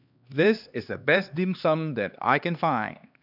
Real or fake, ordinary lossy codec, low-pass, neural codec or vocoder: fake; none; 5.4 kHz; codec, 16 kHz, 2 kbps, X-Codec, HuBERT features, trained on LibriSpeech